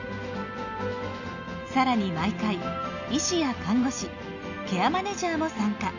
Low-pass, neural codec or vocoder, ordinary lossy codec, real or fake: 7.2 kHz; none; none; real